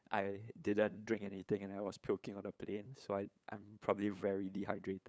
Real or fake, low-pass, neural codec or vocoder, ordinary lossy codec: fake; none; codec, 16 kHz, 8 kbps, FunCodec, trained on LibriTTS, 25 frames a second; none